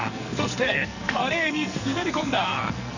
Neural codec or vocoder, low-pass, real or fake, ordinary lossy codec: codec, 44.1 kHz, 2.6 kbps, SNAC; 7.2 kHz; fake; none